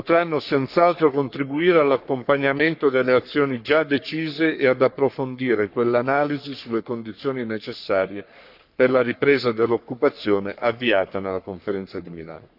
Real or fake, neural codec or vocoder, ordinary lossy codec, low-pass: fake; codec, 44.1 kHz, 3.4 kbps, Pupu-Codec; none; 5.4 kHz